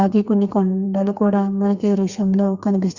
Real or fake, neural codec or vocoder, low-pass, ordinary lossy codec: fake; codec, 32 kHz, 1.9 kbps, SNAC; 7.2 kHz; Opus, 64 kbps